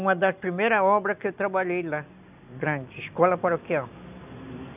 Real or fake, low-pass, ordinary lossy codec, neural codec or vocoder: real; 3.6 kHz; none; none